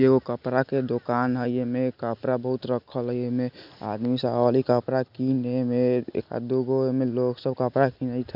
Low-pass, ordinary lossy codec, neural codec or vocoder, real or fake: 5.4 kHz; none; none; real